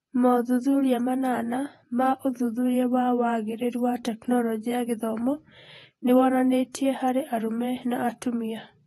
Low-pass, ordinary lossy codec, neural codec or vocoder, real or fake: 19.8 kHz; AAC, 32 kbps; vocoder, 48 kHz, 128 mel bands, Vocos; fake